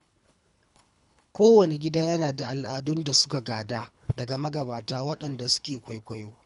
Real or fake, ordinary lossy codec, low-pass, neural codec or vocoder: fake; none; 10.8 kHz; codec, 24 kHz, 3 kbps, HILCodec